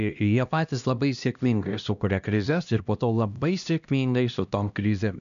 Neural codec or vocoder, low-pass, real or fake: codec, 16 kHz, 1 kbps, X-Codec, HuBERT features, trained on LibriSpeech; 7.2 kHz; fake